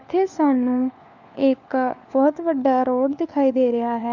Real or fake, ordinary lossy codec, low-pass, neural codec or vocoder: fake; none; 7.2 kHz; codec, 16 kHz, 4 kbps, FunCodec, trained on LibriTTS, 50 frames a second